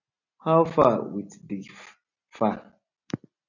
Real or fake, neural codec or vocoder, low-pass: real; none; 7.2 kHz